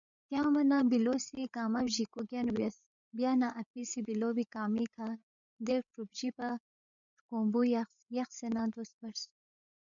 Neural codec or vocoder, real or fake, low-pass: codec, 16 kHz, 8 kbps, FreqCodec, larger model; fake; 7.2 kHz